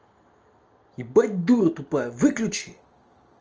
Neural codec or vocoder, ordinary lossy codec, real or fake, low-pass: none; Opus, 24 kbps; real; 7.2 kHz